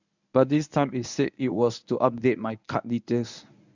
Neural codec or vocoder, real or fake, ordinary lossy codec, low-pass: codec, 24 kHz, 0.9 kbps, WavTokenizer, medium speech release version 1; fake; none; 7.2 kHz